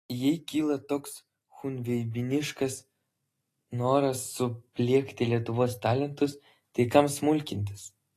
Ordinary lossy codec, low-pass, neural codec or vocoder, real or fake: AAC, 48 kbps; 14.4 kHz; none; real